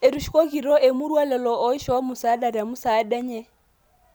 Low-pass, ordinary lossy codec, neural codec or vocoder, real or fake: none; none; none; real